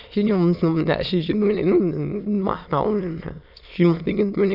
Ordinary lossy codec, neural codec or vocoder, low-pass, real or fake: none; autoencoder, 22.05 kHz, a latent of 192 numbers a frame, VITS, trained on many speakers; 5.4 kHz; fake